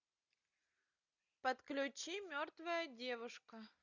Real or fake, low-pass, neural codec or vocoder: real; 7.2 kHz; none